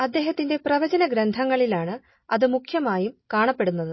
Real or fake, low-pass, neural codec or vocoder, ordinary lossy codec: real; 7.2 kHz; none; MP3, 24 kbps